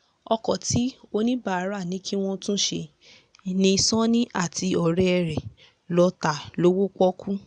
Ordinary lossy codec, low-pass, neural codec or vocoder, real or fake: none; 9.9 kHz; none; real